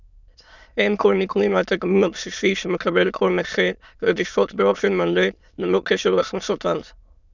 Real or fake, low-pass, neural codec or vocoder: fake; 7.2 kHz; autoencoder, 22.05 kHz, a latent of 192 numbers a frame, VITS, trained on many speakers